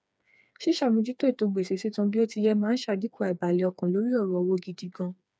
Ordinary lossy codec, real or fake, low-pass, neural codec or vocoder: none; fake; none; codec, 16 kHz, 4 kbps, FreqCodec, smaller model